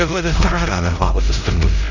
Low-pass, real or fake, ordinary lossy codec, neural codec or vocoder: 7.2 kHz; fake; none; codec, 16 kHz, 0.5 kbps, X-Codec, HuBERT features, trained on LibriSpeech